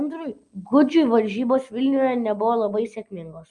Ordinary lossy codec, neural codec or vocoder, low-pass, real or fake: Opus, 32 kbps; none; 10.8 kHz; real